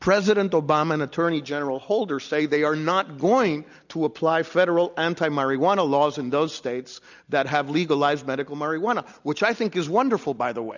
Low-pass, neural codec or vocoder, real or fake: 7.2 kHz; none; real